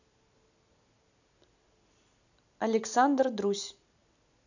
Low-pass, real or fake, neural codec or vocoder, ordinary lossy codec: 7.2 kHz; real; none; none